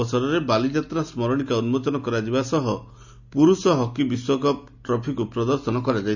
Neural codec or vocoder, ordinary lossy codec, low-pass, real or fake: none; none; 7.2 kHz; real